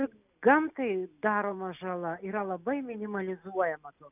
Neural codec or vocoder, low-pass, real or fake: none; 3.6 kHz; real